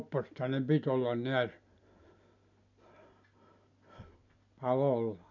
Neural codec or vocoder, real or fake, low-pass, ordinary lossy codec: none; real; 7.2 kHz; none